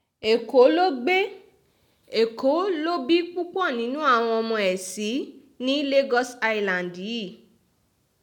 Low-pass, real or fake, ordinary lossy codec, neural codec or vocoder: 19.8 kHz; real; none; none